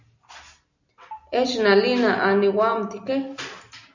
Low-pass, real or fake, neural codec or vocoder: 7.2 kHz; real; none